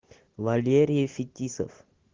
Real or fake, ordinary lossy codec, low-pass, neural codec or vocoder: real; Opus, 16 kbps; 7.2 kHz; none